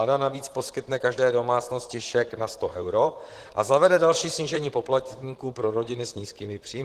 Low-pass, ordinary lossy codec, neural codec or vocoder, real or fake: 14.4 kHz; Opus, 16 kbps; vocoder, 44.1 kHz, 128 mel bands, Pupu-Vocoder; fake